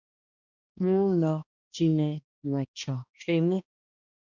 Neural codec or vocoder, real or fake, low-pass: codec, 16 kHz, 1 kbps, X-Codec, HuBERT features, trained on balanced general audio; fake; 7.2 kHz